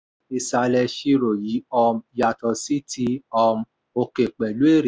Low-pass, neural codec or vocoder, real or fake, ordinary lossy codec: none; none; real; none